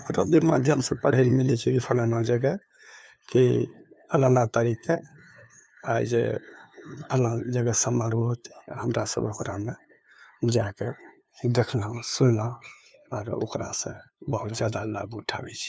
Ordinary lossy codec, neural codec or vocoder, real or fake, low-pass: none; codec, 16 kHz, 2 kbps, FunCodec, trained on LibriTTS, 25 frames a second; fake; none